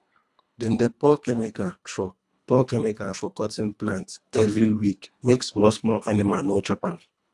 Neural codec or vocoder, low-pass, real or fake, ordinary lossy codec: codec, 24 kHz, 1.5 kbps, HILCodec; none; fake; none